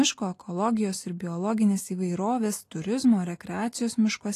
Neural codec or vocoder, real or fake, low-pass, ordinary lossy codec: none; real; 14.4 kHz; AAC, 48 kbps